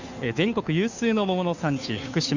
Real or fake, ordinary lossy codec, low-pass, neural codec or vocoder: fake; none; 7.2 kHz; codec, 16 kHz, 2 kbps, FunCodec, trained on Chinese and English, 25 frames a second